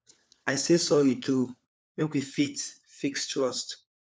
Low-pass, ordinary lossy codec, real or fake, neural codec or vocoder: none; none; fake; codec, 16 kHz, 4 kbps, FunCodec, trained on LibriTTS, 50 frames a second